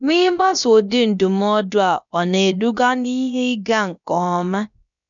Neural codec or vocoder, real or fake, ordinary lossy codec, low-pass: codec, 16 kHz, about 1 kbps, DyCAST, with the encoder's durations; fake; none; 7.2 kHz